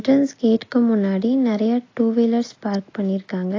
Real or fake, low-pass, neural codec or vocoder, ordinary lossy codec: real; 7.2 kHz; none; AAC, 32 kbps